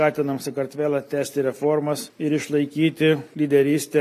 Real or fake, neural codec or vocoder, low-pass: real; none; 14.4 kHz